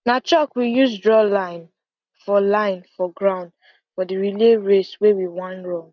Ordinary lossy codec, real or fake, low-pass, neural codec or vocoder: none; real; 7.2 kHz; none